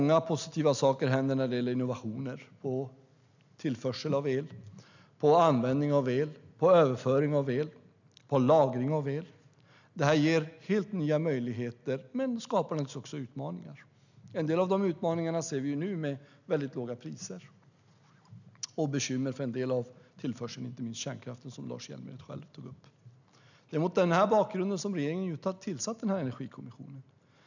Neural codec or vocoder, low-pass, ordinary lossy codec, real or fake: none; 7.2 kHz; none; real